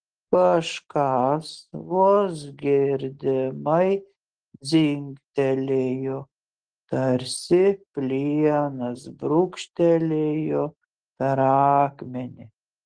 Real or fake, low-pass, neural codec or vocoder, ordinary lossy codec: real; 9.9 kHz; none; Opus, 16 kbps